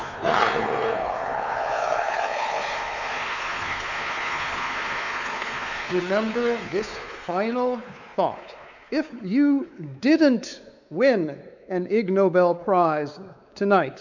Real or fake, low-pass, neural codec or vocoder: fake; 7.2 kHz; codec, 16 kHz, 4 kbps, X-Codec, WavLM features, trained on Multilingual LibriSpeech